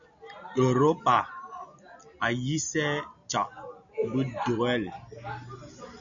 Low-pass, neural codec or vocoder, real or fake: 7.2 kHz; none; real